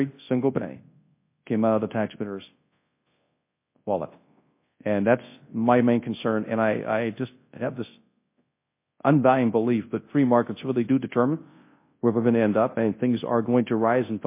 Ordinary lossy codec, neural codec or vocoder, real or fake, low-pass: MP3, 24 kbps; codec, 24 kHz, 0.9 kbps, WavTokenizer, large speech release; fake; 3.6 kHz